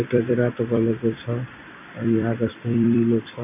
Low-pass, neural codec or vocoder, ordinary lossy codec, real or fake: 3.6 kHz; vocoder, 44.1 kHz, 128 mel bands every 256 samples, BigVGAN v2; none; fake